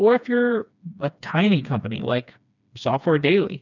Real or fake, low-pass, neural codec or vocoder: fake; 7.2 kHz; codec, 16 kHz, 2 kbps, FreqCodec, smaller model